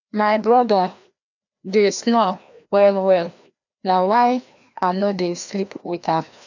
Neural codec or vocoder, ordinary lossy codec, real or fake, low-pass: codec, 16 kHz, 1 kbps, FreqCodec, larger model; none; fake; 7.2 kHz